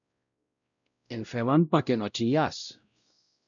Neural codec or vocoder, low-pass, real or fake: codec, 16 kHz, 0.5 kbps, X-Codec, WavLM features, trained on Multilingual LibriSpeech; 7.2 kHz; fake